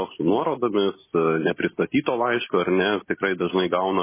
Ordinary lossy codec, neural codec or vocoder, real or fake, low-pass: MP3, 16 kbps; none; real; 3.6 kHz